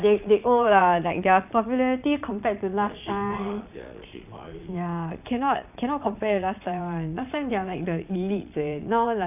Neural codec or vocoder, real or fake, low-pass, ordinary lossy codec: vocoder, 22.05 kHz, 80 mel bands, Vocos; fake; 3.6 kHz; none